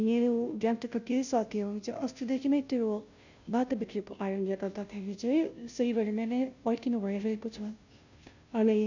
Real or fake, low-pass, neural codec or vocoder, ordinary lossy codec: fake; 7.2 kHz; codec, 16 kHz, 0.5 kbps, FunCodec, trained on Chinese and English, 25 frames a second; none